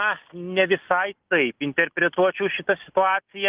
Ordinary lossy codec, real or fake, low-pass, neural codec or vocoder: Opus, 24 kbps; real; 3.6 kHz; none